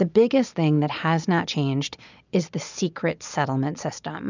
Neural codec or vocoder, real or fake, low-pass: none; real; 7.2 kHz